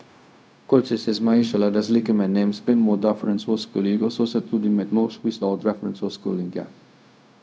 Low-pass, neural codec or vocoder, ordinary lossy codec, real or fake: none; codec, 16 kHz, 0.4 kbps, LongCat-Audio-Codec; none; fake